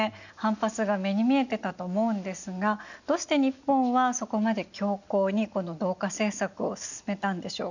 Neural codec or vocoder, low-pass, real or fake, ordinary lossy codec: none; 7.2 kHz; real; none